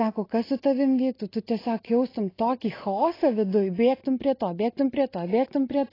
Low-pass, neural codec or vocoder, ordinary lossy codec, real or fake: 5.4 kHz; none; AAC, 24 kbps; real